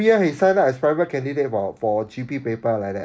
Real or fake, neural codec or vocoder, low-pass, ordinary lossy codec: real; none; none; none